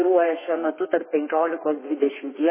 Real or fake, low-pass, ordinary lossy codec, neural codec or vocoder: fake; 3.6 kHz; MP3, 16 kbps; codec, 32 kHz, 1.9 kbps, SNAC